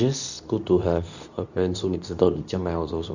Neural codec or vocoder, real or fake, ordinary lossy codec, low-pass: codec, 24 kHz, 0.9 kbps, WavTokenizer, medium speech release version 2; fake; none; 7.2 kHz